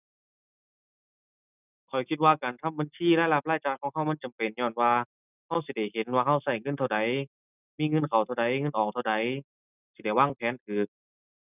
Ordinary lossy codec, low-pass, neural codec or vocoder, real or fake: none; 3.6 kHz; none; real